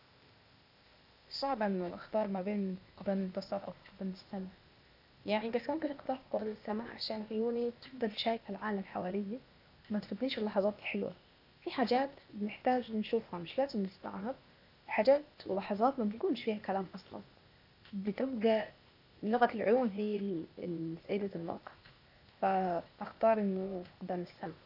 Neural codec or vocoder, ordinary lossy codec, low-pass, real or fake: codec, 16 kHz, 0.8 kbps, ZipCodec; none; 5.4 kHz; fake